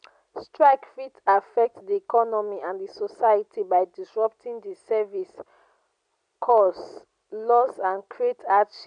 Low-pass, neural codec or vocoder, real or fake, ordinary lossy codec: 9.9 kHz; none; real; none